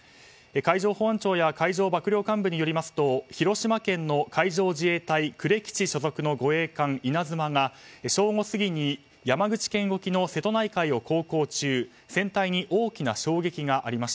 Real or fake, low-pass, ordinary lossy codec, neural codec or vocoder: real; none; none; none